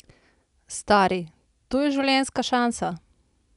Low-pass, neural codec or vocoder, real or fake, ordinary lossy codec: 10.8 kHz; none; real; none